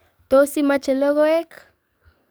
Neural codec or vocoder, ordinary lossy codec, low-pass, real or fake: codec, 44.1 kHz, 7.8 kbps, DAC; none; none; fake